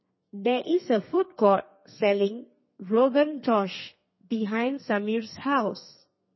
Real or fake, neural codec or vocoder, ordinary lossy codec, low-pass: fake; codec, 32 kHz, 1.9 kbps, SNAC; MP3, 24 kbps; 7.2 kHz